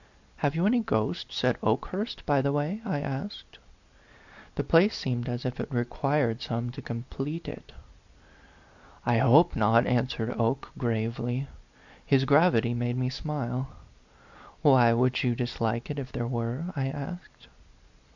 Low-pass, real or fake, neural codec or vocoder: 7.2 kHz; real; none